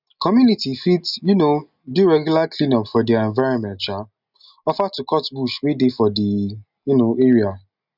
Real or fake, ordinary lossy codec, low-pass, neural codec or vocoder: real; none; 5.4 kHz; none